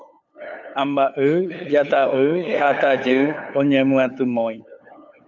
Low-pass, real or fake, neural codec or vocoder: 7.2 kHz; fake; codec, 16 kHz, 8 kbps, FunCodec, trained on LibriTTS, 25 frames a second